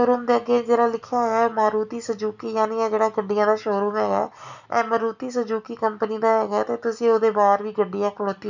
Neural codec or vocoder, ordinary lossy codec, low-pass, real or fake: none; none; 7.2 kHz; real